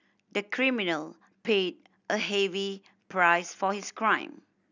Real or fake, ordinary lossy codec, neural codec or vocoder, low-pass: real; none; none; 7.2 kHz